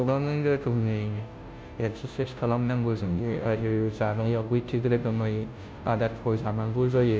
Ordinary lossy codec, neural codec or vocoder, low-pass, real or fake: none; codec, 16 kHz, 0.5 kbps, FunCodec, trained on Chinese and English, 25 frames a second; none; fake